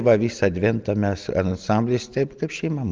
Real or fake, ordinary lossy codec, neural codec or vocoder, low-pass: real; Opus, 32 kbps; none; 7.2 kHz